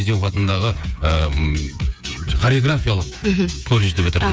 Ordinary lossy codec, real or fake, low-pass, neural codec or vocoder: none; fake; none; codec, 16 kHz, 8 kbps, FreqCodec, smaller model